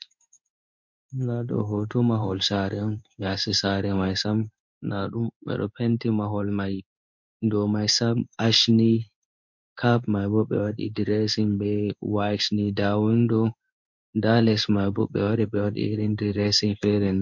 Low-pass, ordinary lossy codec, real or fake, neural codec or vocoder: 7.2 kHz; MP3, 48 kbps; fake; codec, 16 kHz in and 24 kHz out, 1 kbps, XY-Tokenizer